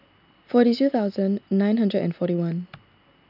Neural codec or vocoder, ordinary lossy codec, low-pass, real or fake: none; none; 5.4 kHz; real